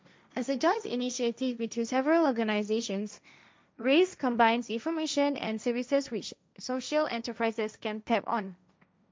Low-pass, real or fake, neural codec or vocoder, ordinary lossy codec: none; fake; codec, 16 kHz, 1.1 kbps, Voila-Tokenizer; none